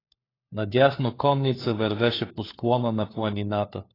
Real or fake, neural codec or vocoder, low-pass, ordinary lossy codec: fake; codec, 16 kHz, 4 kbps, FunCodec, trained on LibriTTS, 50 frames a second; 5.4 kHz; AAC, 24 kbps